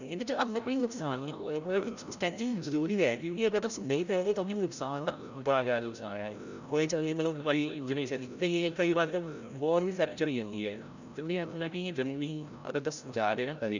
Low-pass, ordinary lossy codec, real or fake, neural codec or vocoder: 7.2 kHz; none; fake; codec, 16 kHz, 0.5 kbps, FreqCodec, larger model